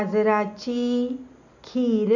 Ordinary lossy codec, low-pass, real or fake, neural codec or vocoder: none; 7.2 kHz; real; none